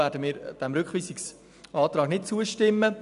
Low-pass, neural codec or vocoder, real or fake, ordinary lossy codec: 10.8 kHz; none; real; none